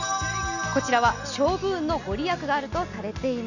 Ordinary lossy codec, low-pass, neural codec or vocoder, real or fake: none; 7.2 kHz; none; real